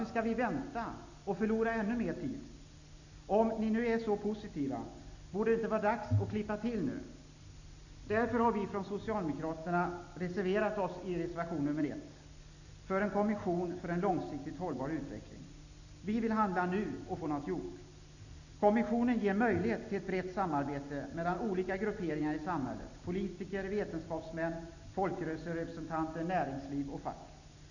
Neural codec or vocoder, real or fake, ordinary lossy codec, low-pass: none; real; none; 7.2 kHz